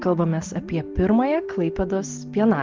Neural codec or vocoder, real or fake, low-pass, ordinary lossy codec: none; real; 7.2 kHz; Opus, 16 kbps